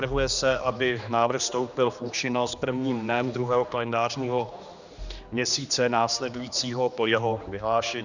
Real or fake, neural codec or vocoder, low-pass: fake; codec, 16 kHz, 2 kbps, X-Codec, HuBERT features, trained on general audio; 7.2 kHz